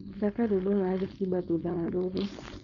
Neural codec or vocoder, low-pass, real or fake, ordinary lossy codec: codec, 16 kHz, 4.8 kbps, FACodec; 7.2 kHz; fake; none